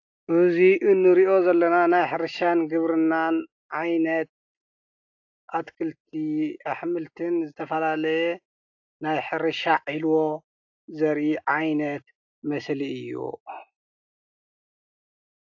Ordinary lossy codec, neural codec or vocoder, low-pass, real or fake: AAC, 48 kbps; none; 7.2 kHz; real